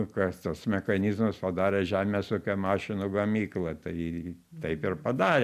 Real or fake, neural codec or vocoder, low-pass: real; none; 14.4 kHz